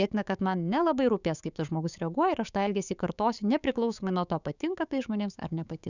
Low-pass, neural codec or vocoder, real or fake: 7.2 kHz; codec, 16 kHz, 6 kbps, DAC; fake